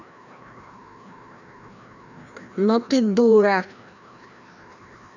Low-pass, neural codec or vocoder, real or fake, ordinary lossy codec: 7.2 kHz; codec, 16 kHz, 1 kbps, FreqCodec, larger model; fake; none